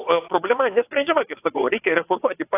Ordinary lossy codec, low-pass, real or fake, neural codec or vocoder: AAC, 24 kbps; 3.6 kHz; fake; vocoder, 22.05 kHz, 80 mel bands, WaveNeXt